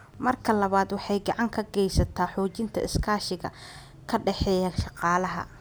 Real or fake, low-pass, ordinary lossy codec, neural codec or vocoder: real; none; none; none